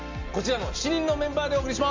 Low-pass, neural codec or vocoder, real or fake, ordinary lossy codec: 7.2 kHz; none; real; MP3, 64 kbps